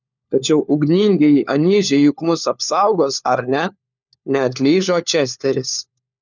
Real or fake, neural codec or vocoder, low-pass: fake; codec, 16 kHz, 4 kbps, FunCodec, trained on LibriTTS, 50 frames a second; 7.2 kHz